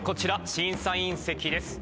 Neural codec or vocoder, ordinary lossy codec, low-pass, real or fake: none; none; none; real